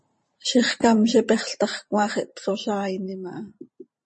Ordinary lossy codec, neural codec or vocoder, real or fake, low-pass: MP3, 32 kbps; vocoder, 44.1 kHz, 128 mel bands every 512 samples, BigVGAN v2; fake; 10.8 kHz